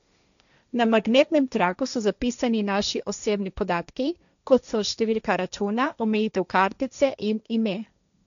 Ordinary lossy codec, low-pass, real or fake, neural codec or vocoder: none; 7.2 kHz; fake; codec, 16 kHz, 1.1 kbps, Voila-Tokenizer